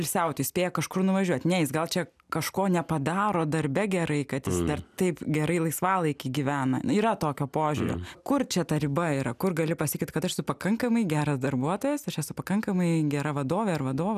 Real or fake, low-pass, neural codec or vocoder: real; 14.4 kHz; none